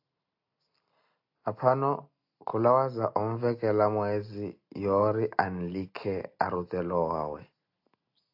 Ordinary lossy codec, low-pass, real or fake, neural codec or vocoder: AAC, 48 kbps; 5.4 kHz; real; none